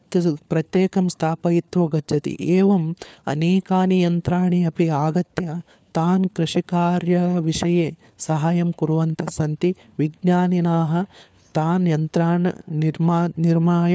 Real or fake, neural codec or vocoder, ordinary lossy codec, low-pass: fake; codec, 16 kHz, 4 kbps, FunCodec, trained on LibriTTS, 50 frames a second; none; none